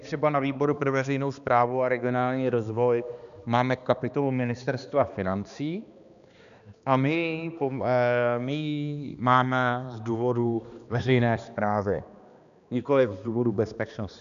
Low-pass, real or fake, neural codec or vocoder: 7.2 kHz; fake; codec, 16 kHz, 2 kbps, X-Codec, HuBERT features, trained on balanced general audio